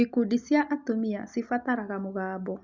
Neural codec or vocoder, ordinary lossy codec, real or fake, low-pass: none; Opus, 64 kbps; real; 7.2 kHz